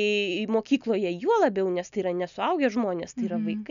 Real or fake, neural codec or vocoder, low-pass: real; none; 7.2 kHz